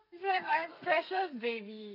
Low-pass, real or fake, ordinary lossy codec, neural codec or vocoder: 5.4 kHz; fake; none; codec, 32 kHz, 1.9 kbps, SNAC